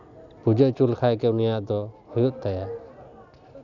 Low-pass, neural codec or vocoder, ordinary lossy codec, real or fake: 7.2 kHz; none; none; real